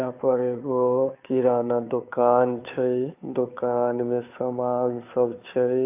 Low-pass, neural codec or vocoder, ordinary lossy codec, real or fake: 3.6 kHz; codec, 16 kHz, 2 kbps, FunCodec, trained on Chinese and English, 25 frames a second; AAC, 32 kbps; fake